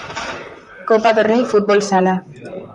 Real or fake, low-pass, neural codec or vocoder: fake; 10.8 kHz; vocoder, 44.1 kHz, 128 mel bands, Pupu-Vocoder